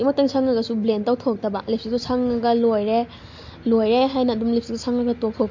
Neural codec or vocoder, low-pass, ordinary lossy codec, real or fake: none; 7.2 kHz; MP3, 48 kbps; real